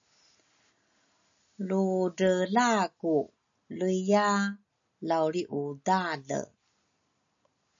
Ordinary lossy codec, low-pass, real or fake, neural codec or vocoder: AAC, 64 kbps; 7.2 kHz; real; none